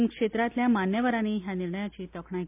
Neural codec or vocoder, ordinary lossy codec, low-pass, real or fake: none; MP3, 32 kbps; 3.6 kHz; real